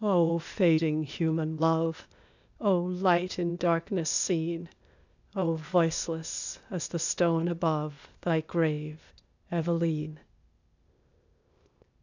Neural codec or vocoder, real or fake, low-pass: codec, 16 kHz, 0.8 kbps, ZipCodec; fake; 7.2 kHz